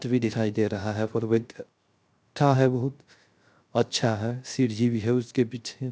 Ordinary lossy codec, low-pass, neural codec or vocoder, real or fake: none; none; codec, 16 kHz, 0.3 kbps, FocalCodec; fake